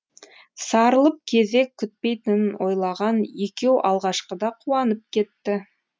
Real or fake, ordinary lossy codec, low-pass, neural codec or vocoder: real; none; none; none